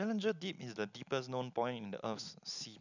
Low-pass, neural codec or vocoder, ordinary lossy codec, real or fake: 7.2 kHz; none; none; real